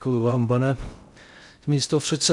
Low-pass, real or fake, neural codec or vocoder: 10.8 kHz; fake; codec, 16 kHz in and 24 kHz out, 0.6 kbps, FocalCodec, streaming, 2048 codes